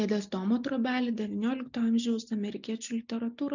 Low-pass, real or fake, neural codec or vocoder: 7.2 kHz; real; none